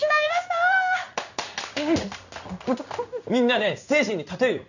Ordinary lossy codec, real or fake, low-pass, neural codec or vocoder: none; fake; 7.2 kHz; codec, 16 kHz in and 24 kHz out, 1 kbps, XY-Tokenizer